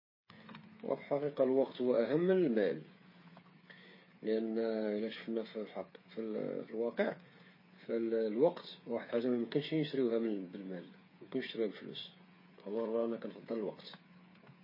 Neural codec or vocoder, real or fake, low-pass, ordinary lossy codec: codec, 16 kHz, 8 kbps, FreqCodec, smaller model; fake; 5.4 kHz; MP3, 24 kbps